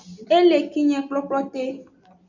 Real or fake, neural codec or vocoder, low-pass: real; none; 7.2 kHz